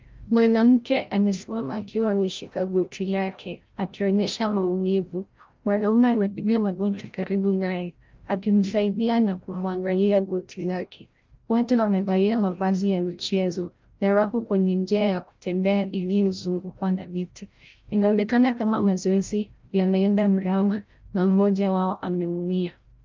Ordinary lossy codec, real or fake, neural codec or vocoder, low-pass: Opus, 32 kbps; fake; codec, 16 kHz, 0.5 kbps, FreqCodec, larger model; 7.2 kHz